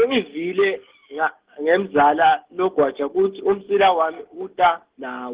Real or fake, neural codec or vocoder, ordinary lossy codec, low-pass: real; none; Opus, 16 kbps; 3.6 kHz